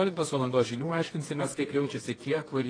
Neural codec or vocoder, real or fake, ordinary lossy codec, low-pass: codec, 24 kHz, 0.9 kbps, WavTokenizer, medium music audio release; fake; AAC, 32 kbps; 9.9 kHz